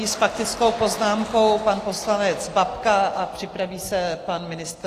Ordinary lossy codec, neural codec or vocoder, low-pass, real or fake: AAC, 48 kbps; none; 14.4 kHz; real